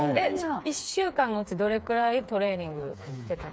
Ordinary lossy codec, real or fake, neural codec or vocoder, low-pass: none; fake; codec, 16 kHz, 4 kbps, FreqCodec, smaller model; none